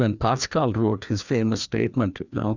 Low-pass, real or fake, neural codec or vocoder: 7.2 kHz; fake; codec, 16 kHz, 2 kbps, FreqCodec, larger model